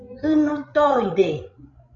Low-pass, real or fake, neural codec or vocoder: 7.2 kHz; fake; codec, 16 kHz, 16 kbps, FreqCodec, larger model